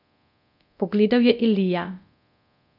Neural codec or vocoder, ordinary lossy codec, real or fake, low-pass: codec, 24 kHz, 0.9 kbps, DualCodec; none; fake; 5.4 kHz